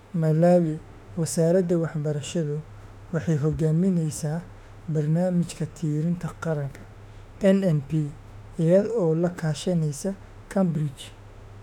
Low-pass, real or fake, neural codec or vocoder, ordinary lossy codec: 19.8 kHz; fake; autoencoder, 48 kHz, 32 numbers a frame, DAC-VAE, trained on Japanese speech; none